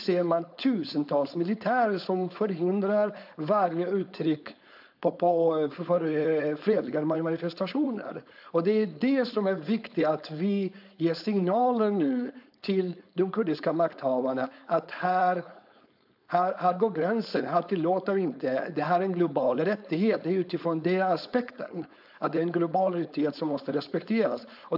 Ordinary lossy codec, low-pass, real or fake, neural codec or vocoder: AAC, 48 kbps; 5.4 kHz; fake; codec, 16 kHz, 4.8 kbps, FACodec